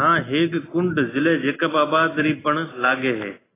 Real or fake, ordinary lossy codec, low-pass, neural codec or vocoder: real; AAC, 16 kbps; 3.6 kHz; none